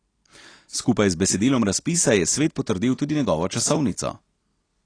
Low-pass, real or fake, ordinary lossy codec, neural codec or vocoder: 9.9 kHz; real; AAC, 32 kbps; none